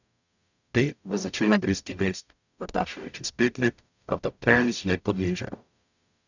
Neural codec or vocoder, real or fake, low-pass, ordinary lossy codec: codec, 44.1 kHz, 0.9 kbps, DAC; fake; 7.2 kHz; none